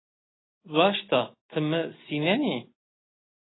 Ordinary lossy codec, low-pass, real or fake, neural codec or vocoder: AAC, 16 kbps; 7.2 kHz; real; none